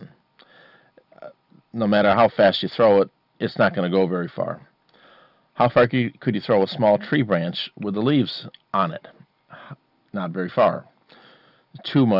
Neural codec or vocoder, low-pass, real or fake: none; 5.4 kHz; real